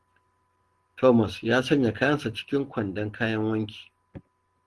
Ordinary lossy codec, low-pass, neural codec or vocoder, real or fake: Opus, 16 kbps; 10.8 kHz; none; real